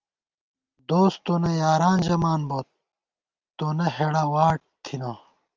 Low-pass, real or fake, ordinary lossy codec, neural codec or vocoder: 7.2 kHz; real; Opus, 32 kbps; none